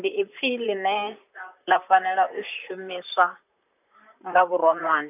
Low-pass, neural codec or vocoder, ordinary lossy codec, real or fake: 3.6 kHz; vocoder, 44.1 kHz, 128 mel bands, Pupu-Vocoder; none; fake